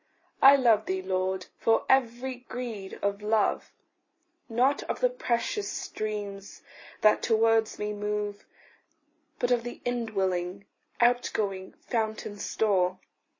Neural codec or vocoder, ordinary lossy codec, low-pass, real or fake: none; MP3, 32 kbps; 7.2 kHz; real